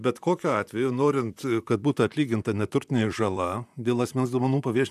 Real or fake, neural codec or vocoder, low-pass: fake; vocoder, 44.1 kHz, 128 mel bands, Pupu-Vocoder; 14.4 kHz